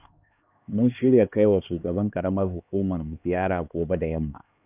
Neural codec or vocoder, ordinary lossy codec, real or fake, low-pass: codec, 16 kHz, 2 kbps, X-Codec, HuBERT features, trained on LibriSpeech; none; fake; 3.6 kHz